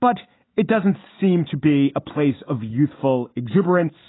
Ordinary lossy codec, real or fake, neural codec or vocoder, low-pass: AAC, 16 kbps; real; none; 7.2 kHz